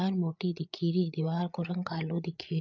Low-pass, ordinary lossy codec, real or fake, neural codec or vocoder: 7.2 kHz; none; fake; codec, 16 kHz, 8 kbps, FreqCodec, larger model